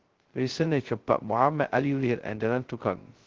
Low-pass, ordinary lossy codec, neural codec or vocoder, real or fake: 7.2 kHz; Opus, 16 kbps; codec, 16 kHz, 0.2 kbps, FocalCodec; fake